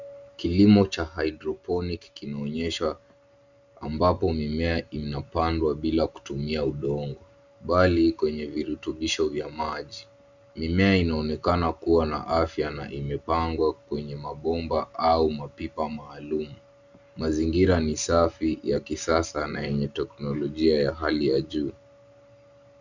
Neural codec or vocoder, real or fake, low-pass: none; real; 7.2 kHz